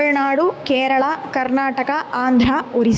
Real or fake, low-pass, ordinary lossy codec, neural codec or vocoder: fake; none; none; codec, 16 kHz, 6 kbps, DAC